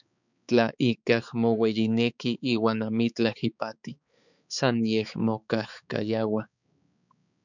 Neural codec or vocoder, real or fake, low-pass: codec, 16 kHz, 4 kbps, X-Codec, HuBERT features, trained on balanced general audio; fake; 7.2 kHz